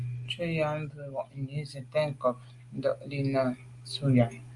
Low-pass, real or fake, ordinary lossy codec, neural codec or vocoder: 10.8 kHz; real; Opus, 32 kbps; none